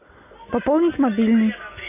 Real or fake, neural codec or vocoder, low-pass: real; none; 3.6 kHz